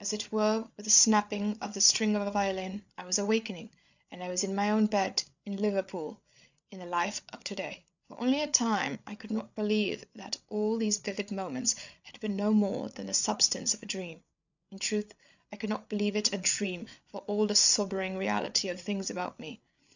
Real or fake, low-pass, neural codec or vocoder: fake; 7.2 kHz; codec, 16 kHz, 8 kbps, FreqCodec, larger model